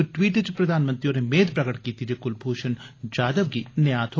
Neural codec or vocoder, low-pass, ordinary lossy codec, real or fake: none; 7.2 kHz; AAC, 32 kbps; real